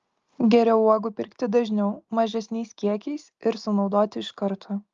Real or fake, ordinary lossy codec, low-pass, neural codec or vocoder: real; Opus, 32 kbps; 7.2 kHz; none